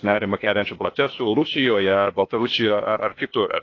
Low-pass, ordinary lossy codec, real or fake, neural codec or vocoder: 7.2 kHz; AAC, 32 kbps; fake; codec, 16 kHz, 0.8 kbps, ZipCodec